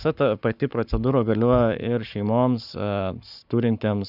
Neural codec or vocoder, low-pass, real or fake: codec, 44.1 kHz, 7.8 kbps, Pupu-Codec; 5.4 kHz; fake